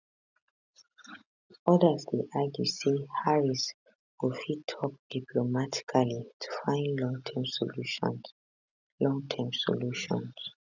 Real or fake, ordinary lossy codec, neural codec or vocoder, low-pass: real; none; none; 7.2 kHz